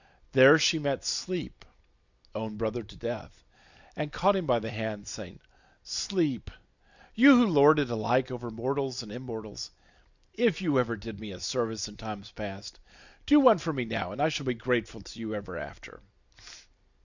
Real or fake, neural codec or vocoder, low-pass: real; none; 7.2 kHz